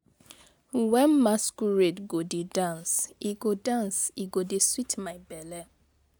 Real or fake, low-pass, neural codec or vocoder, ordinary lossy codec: real; none; none; none